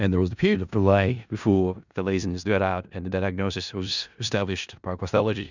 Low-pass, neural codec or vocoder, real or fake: 7.2 kHz; codec, 16 kHz in and 24 kHz out, 0.4 kbps, LongCat-Audio-Codec, four codebook decoder; fake